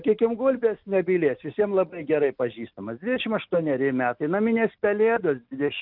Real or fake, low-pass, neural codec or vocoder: real; 5.4 kHz; none